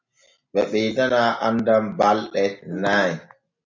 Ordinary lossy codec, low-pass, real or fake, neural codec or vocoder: MP3, 64 kbps; 7.2 kHz; real; none